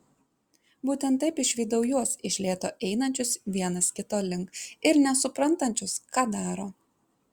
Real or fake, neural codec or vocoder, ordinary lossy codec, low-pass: real; none; Opus, 64 kbps; 19.8 kHz